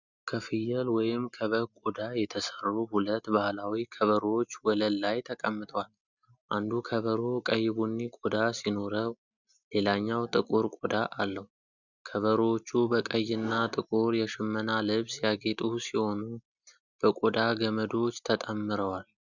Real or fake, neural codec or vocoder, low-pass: real; none; 7.2 kHz